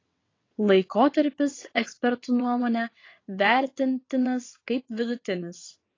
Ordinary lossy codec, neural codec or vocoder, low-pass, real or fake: AAC, 32 kbps; vocoder, 22.05 kHz, 80 mel bands, WaveNeXt; 7.2 kHz; fake